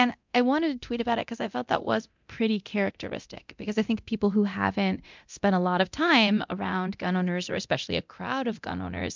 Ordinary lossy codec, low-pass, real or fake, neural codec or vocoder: MP3, 64 kbps; 7.2 kHz; fake; codec, 24 kHz, 0.9 kbps, DualCodec